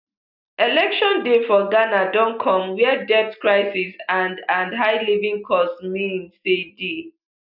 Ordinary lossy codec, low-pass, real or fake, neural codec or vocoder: none; 5.4 kHz; real; none